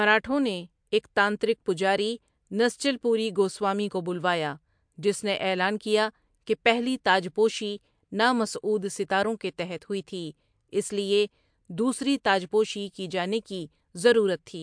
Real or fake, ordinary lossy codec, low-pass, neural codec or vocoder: real; MP3, 64 kbps; 9.9 kHz; none